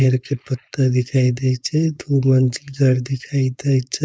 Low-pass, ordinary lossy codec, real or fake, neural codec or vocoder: none; none; fake; codec, 16 kHz, 4.8 kbps, FACodec